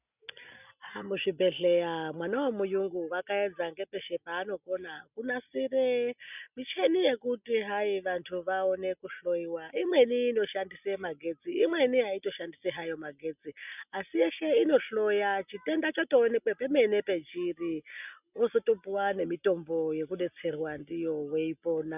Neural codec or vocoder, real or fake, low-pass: none; real; 3.6 kHz